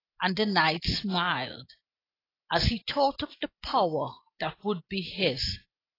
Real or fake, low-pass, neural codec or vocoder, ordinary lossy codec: real; 5.4 kHz; none; AAC, 24 kbps